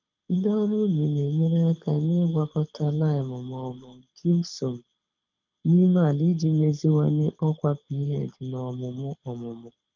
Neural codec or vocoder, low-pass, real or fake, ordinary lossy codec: codec, 24 kHz, 6 kbps, HILCodec; 7.2 kHz; fake; none